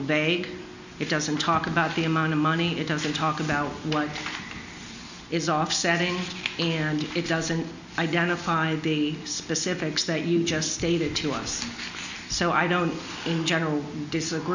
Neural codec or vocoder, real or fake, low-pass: none; real; 7.2 kHz